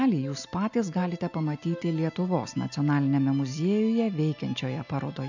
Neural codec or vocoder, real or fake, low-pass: none; real; 7.2 kHz